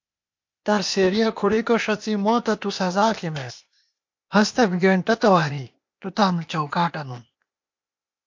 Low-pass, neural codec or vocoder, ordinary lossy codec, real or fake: 7.2 kHz; codec, 16 kHz, 0.8 kbps, ZipCodec; MP3, 48 kbps; fake